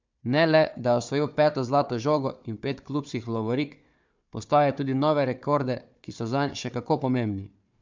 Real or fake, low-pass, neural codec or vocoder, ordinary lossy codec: fake; 7.2 kHz; codec, 16 kHz, 4 kbps, FunCodec, trained on Chinese and English, 50 frames a second; MP3, 64 kbps